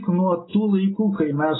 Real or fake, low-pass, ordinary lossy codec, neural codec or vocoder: real; 7.2 kHz; AAC, 16 kbps; none